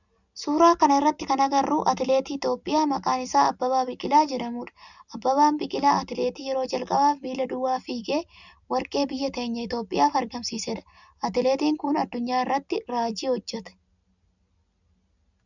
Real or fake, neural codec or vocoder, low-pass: real; none; 7.2 kHz